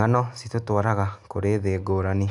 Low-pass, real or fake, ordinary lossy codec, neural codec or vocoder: 10.8 kHz; real; none; none